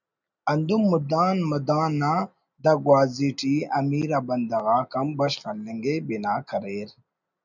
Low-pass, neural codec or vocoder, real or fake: 7.2 kHz; none; real